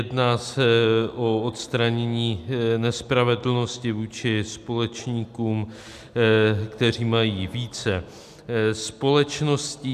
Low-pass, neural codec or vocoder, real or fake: 14.4 kHz; none; real